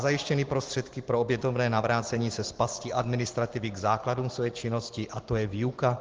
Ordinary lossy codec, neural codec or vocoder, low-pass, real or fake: Opus, 16 kbps; none; 7.2 kHz; real